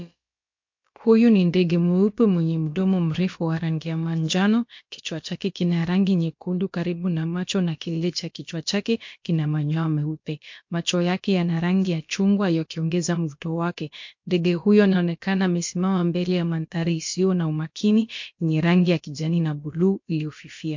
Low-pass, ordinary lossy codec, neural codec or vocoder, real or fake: 7.2 kHz; MP3, 48 kbps; codec, 16 kHz, about 1 kbps, DyCAST, with the encoder's durations; fake